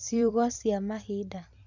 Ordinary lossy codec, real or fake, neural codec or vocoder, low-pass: none; fake; vocoder, 44.1 kHz, 128 mel bands every 256 samples, BigVGAN v2; 7.2 kHz